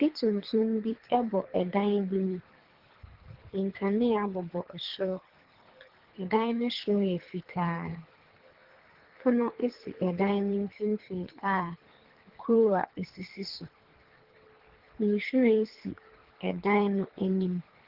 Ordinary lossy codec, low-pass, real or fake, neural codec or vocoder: Opus, 16 kbps; 5.4 kHz; fake; codec, 24 kHz, 3 kbps, HILCodec